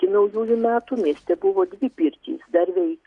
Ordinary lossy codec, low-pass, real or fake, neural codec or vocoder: Opus, 64 kbps; 10.8 kHz; real; none